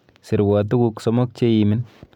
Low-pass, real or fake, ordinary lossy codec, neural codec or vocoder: 19.8 kHz; real; none; none